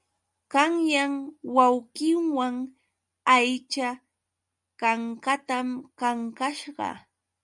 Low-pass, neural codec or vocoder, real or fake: 10.8 kHz; none; real